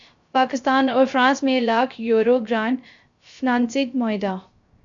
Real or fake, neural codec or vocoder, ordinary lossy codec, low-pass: fake; codec, 16 kHz, 0.3 kbps, FocalCodec; MP3, 64 kbps; 7.2 kHz